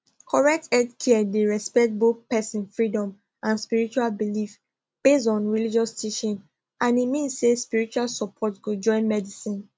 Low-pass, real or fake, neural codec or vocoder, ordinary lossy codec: none; real; none; none